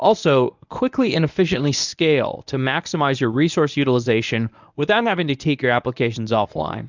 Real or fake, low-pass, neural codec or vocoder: fake; 7.2 kHz; codec, 24 kHz, 0.9 kbps, WavTokenizer, medium speech release version 1